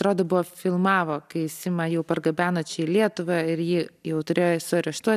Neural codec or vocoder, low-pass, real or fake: none; 14.4 kHz; real